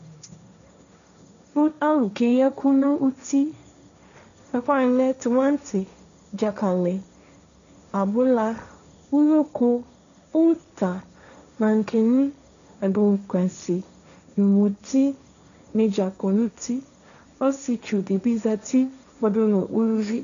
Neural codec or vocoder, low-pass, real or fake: codec, 16 kHz, 1.1 kbps, Voila-Tokenizer; 7.2 kHz; fake